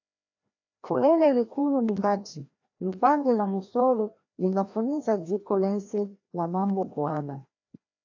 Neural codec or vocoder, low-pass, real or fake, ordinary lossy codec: codec, 16 kHz, 1 kbps, FreqCodec, larger model; 7.2 kHz; fake; AAC, 48 kbps